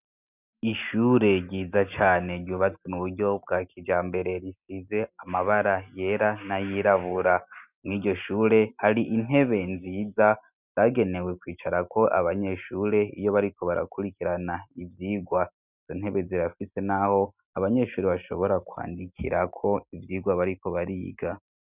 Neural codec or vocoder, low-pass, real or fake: none; 3.6 kHz; real